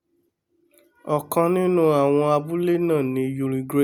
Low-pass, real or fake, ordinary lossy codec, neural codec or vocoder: none; real; none; none